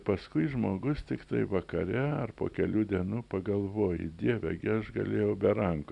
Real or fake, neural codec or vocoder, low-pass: real; none; 10.8 kHz